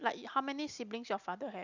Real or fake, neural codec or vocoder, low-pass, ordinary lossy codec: real; none; 7.2 kHz; none